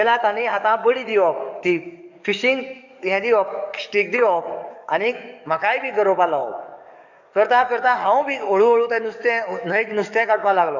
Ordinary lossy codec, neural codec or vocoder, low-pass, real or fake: none; codec, 44.1 kHz, 7.8 kbps, DAC; 7.2 kHz; fake